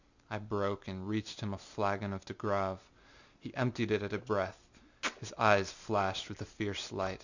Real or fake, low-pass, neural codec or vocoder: real; 7.2 kHz; none